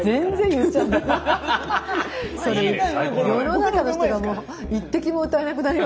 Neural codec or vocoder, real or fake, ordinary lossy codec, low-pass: none; real; none; none